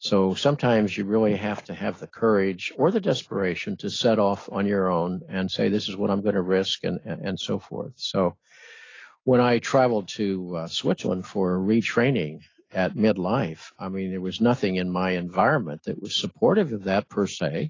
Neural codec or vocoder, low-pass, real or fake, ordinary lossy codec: none; 7.2 kHz; real; AAC, 32 kbps